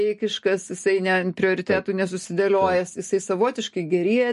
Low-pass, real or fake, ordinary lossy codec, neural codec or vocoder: 14.4 kHz; real; MP3, 48 kbps; none